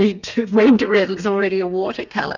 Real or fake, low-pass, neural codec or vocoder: fake; 7.2 kHz; codec, 32 kHz, 1.9 kbps, SNAC